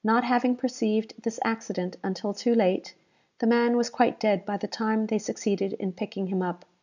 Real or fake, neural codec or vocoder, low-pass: real; none; 7.2 kHz